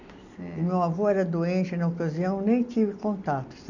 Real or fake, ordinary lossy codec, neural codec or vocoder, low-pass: real; none; none; 7.2 kHz